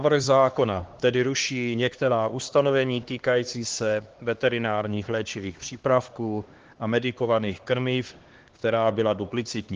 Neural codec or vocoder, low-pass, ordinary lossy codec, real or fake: codec, 16 kHz, 2 kbps, X-Codec, HuBERT features, trained on LibriSpeech; 7.2 kHz; Opus, 16 kbps; fake